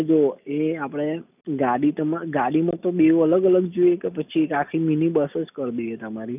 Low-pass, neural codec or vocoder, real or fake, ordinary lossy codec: 3.6 kHz; none; real; none